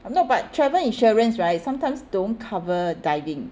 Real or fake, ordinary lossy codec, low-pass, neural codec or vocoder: real; none; none; none